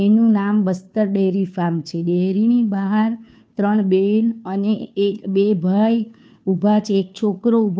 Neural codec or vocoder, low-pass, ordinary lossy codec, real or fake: codec, 16 kHz, 2 kbps, FunCodec, trained on Chinese and English, 25 frames a second; none; none; fake